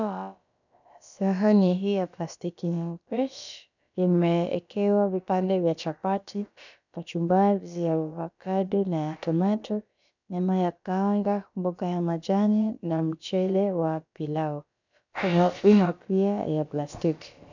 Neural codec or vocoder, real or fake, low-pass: codec, 16 kHz, about 1 kbps, DyCAST, with the encoder's durations; fake; 7.2 kHz